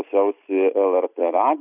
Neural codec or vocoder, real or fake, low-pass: none; real; 3.6 kHz